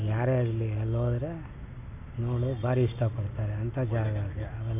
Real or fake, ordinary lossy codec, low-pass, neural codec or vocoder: real; none; 3.6 kHz; none